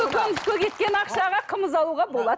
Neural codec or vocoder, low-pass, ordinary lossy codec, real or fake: none; none; none; real